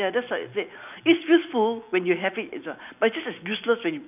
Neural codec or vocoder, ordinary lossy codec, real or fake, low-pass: none; none; real; 3.6 kHz